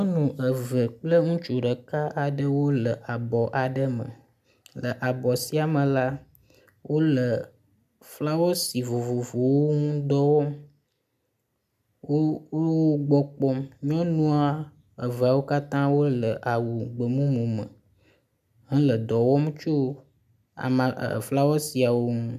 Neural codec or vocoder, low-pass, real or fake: none; 14.4 kHz; real